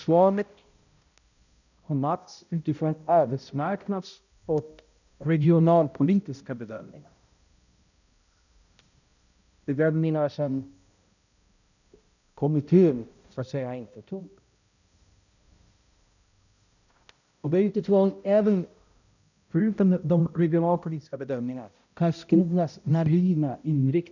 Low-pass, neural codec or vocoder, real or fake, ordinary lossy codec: 7.2 kHz; codec, 16 kHz, 0.5 kbps, X-Codec, HuBERT features, trained on balanced general audio; fake; none